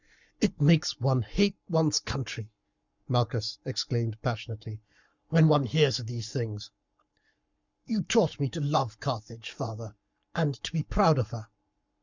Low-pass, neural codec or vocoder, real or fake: 7.2 kHz; codec, 44.1 kHz, 7.8 kbps, Pupu-Codec; fake